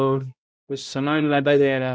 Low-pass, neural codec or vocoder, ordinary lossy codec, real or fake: none; codec, 16 kHz, 0.5 kbps, X-Codec, HuBERT features, trained on balanced general audio; none; fake